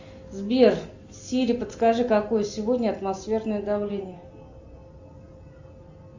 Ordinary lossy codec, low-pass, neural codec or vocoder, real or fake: Opus, 64 kbps; 7.2 kHz; none; real